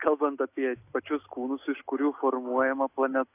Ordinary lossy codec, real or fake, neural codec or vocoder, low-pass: AAC, 24 kbps; real; none; 3.6 kHz